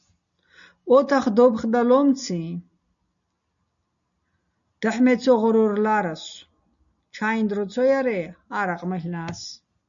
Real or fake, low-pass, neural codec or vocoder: real; 7.2 kHz; none